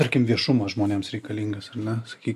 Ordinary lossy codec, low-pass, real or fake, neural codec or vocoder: AAC, 96 kbps; 14.4 kHz; real; none